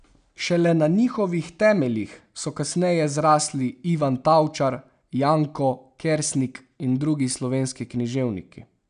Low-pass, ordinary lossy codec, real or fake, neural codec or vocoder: 9.9 kHz; none; real; none